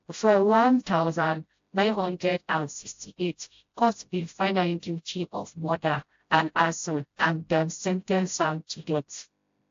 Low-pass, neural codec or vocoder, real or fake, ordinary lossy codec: 7.2 kHz; codec, 16 kHz, 0.5 kbps, FreqCodec, smaller model; fake; AAC, 48 kbps